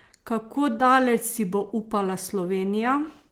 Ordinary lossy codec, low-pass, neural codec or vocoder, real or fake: Opus, 16 kbps; 19.8 kHz; none; real